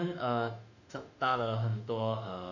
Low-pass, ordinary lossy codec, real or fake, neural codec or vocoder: 7.2 kHz; none; fake; autoencoder, 48 kHz, 32 numbers a frame, DAC-VAE, trained on Japanese speech